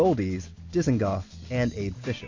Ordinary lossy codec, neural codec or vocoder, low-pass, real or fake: AAC, 48 kbps; none; 7.2 kHz; real